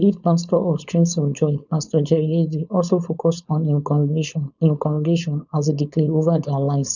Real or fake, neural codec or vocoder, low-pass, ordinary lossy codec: fake; codec, 16 kHz, 4.8 kbps, FACodec; 7.2 kHz; none